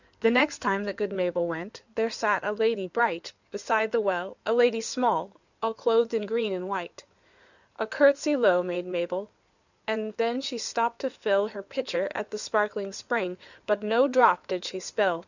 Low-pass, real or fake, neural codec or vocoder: 7.2 kHz; fake; codec, 16 kHz in and 24 kHz out, 2.2 kbps, FireRedTTS-2 codec